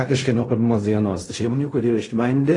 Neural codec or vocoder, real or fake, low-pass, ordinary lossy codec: codec, 16 kHz in and 24 kHz out, 0.4 kbps, LongCat-Audio-Codec, fine tuned four codebook decoder; fake; 10.8 kHz; AAC, 32 kbps